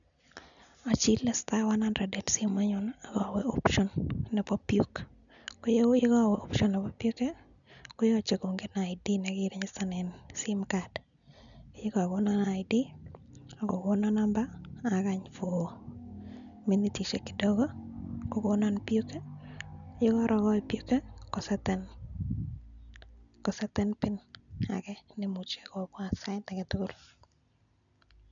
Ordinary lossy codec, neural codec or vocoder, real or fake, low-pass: none; none; real; 7.2 kHz